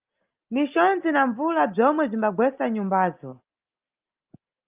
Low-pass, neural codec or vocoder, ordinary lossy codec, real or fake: 3.6 kHz; none; Opus, 24 kbps; real